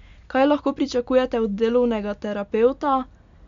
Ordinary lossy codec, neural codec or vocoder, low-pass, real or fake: MP3, 64 kbps; none; 7.2 kHz; real